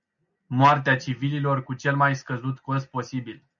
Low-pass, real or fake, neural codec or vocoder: 7.2 kHz; real; none